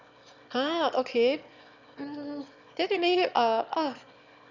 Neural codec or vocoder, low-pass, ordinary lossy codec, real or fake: autoencoder, 22.05 kHz, a latent of 192 numbers a frame, VITS, trained on one speaker; 7.2 kHz; none; fake